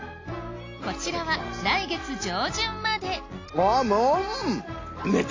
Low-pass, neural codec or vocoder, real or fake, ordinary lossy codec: 7.2 kHz; none; real; AAC, 32 kbps